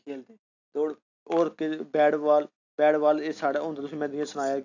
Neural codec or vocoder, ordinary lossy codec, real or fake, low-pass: none; AAC, 48 kbps; real; 7.2 kHz